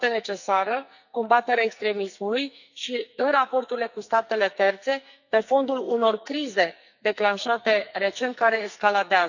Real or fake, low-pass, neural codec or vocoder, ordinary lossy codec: fake; 7.2 kHz; codec, 44.1 kHz, 2.6 kbps, SNAC; none